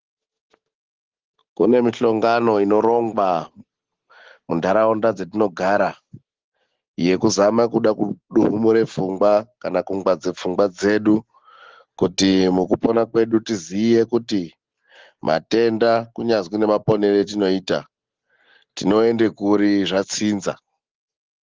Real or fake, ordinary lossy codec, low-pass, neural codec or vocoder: real; Opus, 16 kbps; 7.2 kHz; none